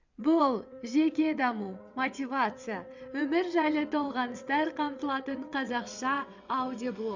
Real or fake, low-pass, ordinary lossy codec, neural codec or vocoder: fake; 7.2 kHz; Opus, 64 kbps; vocoder, 22.05 kHz, 80 mel bands, WaveNeXt